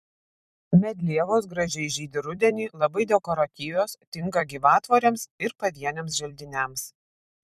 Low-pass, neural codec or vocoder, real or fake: 14.4 kHz; vocoder, 44.1 kHz, 128 mel bands every 256 samples, BigVGAN v2; fake